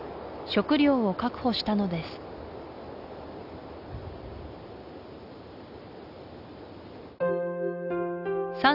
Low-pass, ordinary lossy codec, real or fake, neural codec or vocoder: 5.4 kHz; none; real; none